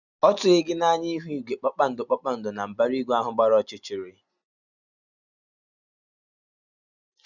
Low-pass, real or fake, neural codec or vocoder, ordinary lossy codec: 7.2 kHz; real; none; none